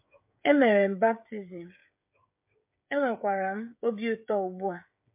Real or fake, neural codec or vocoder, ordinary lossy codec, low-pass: fake; codec, 16 kHz, 16 kbps, FreqCodec, smaller model; MP3, 32 kbps; 3.6 kHz